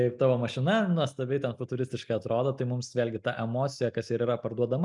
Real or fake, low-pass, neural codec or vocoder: real; 10.8 kHz; none